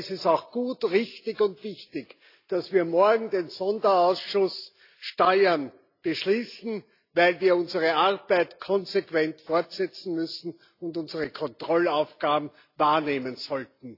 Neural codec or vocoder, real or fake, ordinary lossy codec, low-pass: none; real; AAC, 32 kbps; 5.4 kHz